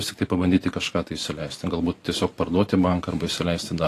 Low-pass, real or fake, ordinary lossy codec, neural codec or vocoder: 14.4 kHz; fake; AAC, 48 kbps; vocoder, 44.1 kHz, 128 mel bands every 512 samples, BigVGAN v2